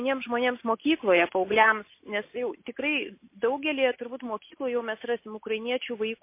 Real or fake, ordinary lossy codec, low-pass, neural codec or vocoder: real; MP3, 24 kbps; 3.6 kHz; none